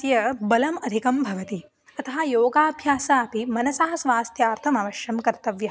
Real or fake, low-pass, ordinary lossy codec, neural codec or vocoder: real; none; none; none